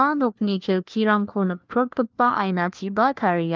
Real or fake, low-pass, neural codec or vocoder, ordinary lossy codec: fake; 7.2 kHz; codec, 16 kHz, 1 kbps, FunCodec, trained on LibriTTS, 50 frames a second; Opus, 32 kbps